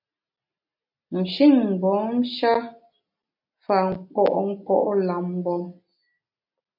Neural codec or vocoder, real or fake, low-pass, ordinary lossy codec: none; real; 5.4 kHz; AAC, 48 kbps